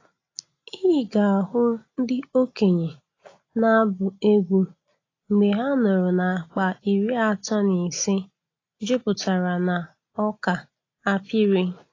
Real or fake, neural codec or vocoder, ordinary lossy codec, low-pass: real; none; AAC, 32 kbps; 7.2 kHz